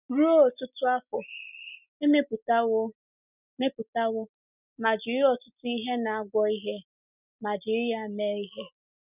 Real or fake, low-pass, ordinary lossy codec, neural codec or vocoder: real; 3.6 kHz; none; none